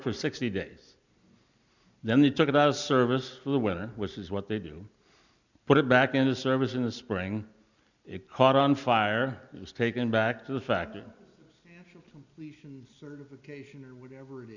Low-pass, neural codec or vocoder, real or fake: 7.2 kHz; none; real